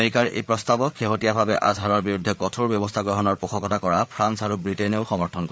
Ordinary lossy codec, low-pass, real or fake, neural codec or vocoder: none; none; fake; codec, 16 kHz, 8 kbps, FreqCodec, larger model